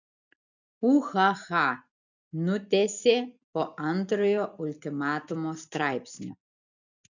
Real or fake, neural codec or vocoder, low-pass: real; none; 7.2 kHz